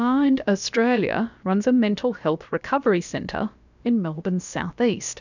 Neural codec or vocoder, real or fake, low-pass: codec, 16 kHz, about 1 kbps, DyCAST, with the encoder's durations; fake; 7.2 kHz